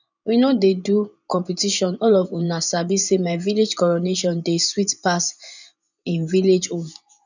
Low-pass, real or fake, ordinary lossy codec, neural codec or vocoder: 7.2 kHz; fake; none; vocoder, 24 kHz, 100 mel bands, Vocos